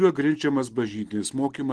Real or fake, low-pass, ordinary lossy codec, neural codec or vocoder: real; 10.8 kHz; Opus, 16 kbps; none